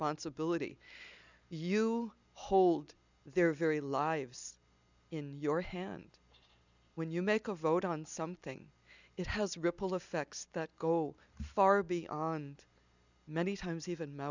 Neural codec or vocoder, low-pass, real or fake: none; 7.2 kHz; real